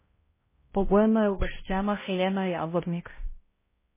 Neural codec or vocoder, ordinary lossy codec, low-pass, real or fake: codec, 16 kHz, 0.5 kbps, X-Codec, HuBERT features, trained on balanced general audio; MP3, 16 kbps; 3.6 kHz; fake